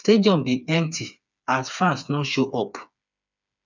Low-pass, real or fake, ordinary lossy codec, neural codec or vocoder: 7.2 kHz; fake; none; codec, 16 kHz, 4 kbps, FreqCodec, smaller model